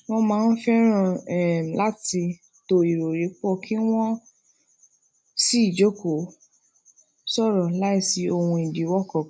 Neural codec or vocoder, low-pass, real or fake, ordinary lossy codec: none; none; real; none